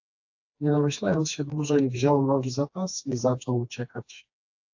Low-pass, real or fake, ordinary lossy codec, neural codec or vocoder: 7.2 kHz; fake; AAC, 48 kbps; codec, 16 kHz, 2 kbps, FreqCodec, smaller model